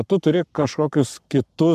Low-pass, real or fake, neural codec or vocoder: 14.4 kHz; fake; codec, 44.1 kHz, 7.8 kbps, Pupu-Codec